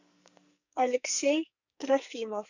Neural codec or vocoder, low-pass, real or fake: codec, 44.1 kHz, 2.6 kbps, SNAC; 7.2 kHz; fake